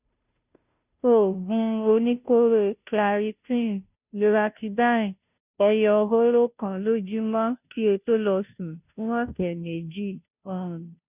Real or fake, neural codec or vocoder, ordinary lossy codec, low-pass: fake; codec, 16 kHz, 0.5 kbps, FunCodec, trained on Chinese and English, 25 frames a second; none; 3.6 kHz